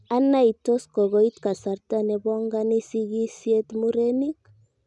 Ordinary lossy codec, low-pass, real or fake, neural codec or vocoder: none; 10.8 kHz; real; none